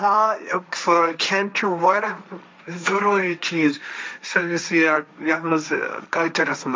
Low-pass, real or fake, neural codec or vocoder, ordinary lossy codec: none; fake; codec, 16 kHz, 1.1 kbps, Voila-Tokenizer; none